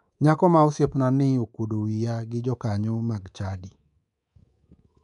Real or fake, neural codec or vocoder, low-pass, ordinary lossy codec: fake; codec, 24 kHz, 3.1 kbps, DualCodec; 10.8 kHz; none